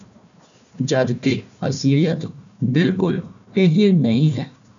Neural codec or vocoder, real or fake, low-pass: codec, 16 kHz, 1 kbps, FunCodec, trained on Chinese and English, 50 frames a second; fake; 7.2 kHz